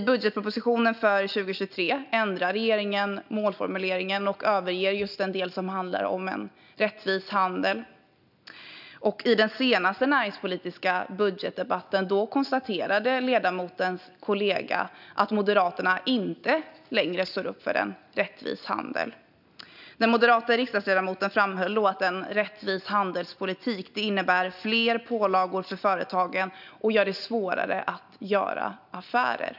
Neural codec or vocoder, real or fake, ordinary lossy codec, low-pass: none; real; none; 5.4 kHz